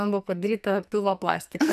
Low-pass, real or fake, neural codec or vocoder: 14.4 kHz; fake; codec, 44.1 kHz, 2.6 kbps, SNAC